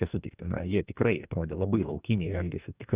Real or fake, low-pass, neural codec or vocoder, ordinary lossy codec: fake; 3.6 kHz; codec, 44.1 kHz, 2.6 kbps, DAC; Opus, 64 kbps